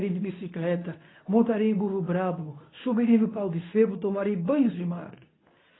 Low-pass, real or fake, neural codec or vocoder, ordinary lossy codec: 7.2 kHz; fake; codec, 24 kHz, 0.9 kbps, WavTokenizer, medium speech release version 2; AAC, 16 kbps